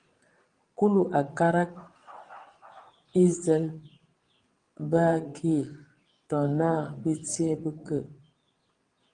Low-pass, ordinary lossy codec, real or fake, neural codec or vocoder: 9.9 kHz; Opus, 24 kbps; fake; vocoder, 22.05 kHz, 80 mel bands, WaveNeXt